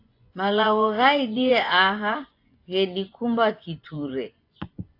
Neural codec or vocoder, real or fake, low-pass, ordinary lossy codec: vocoder, 24 kHz, 100 mel bands, Vocos; fake; 5.4 kHz; AAC, 32 kbps